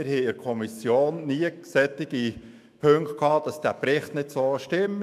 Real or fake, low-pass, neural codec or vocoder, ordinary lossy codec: fake; 14.4 kHz; autoencoder, 48 kHz, 128 numbers a frame, DAC-VAE, trained on Japanese speech; none